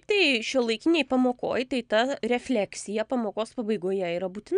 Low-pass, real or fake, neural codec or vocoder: 9.9 kHz; real; none